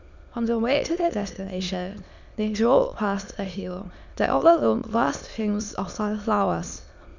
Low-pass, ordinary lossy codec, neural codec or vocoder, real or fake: 7.2 kHz; none; autoencoder, 22.05 kHz, a latent of 192 numbers a frame, VITS, trained on many speakers; fake